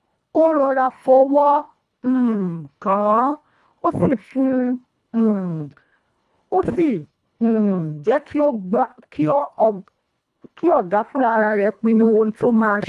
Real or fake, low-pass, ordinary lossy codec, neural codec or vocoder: fake; none; none; codec, 24 kHz, 1.5 kbps, HILCodec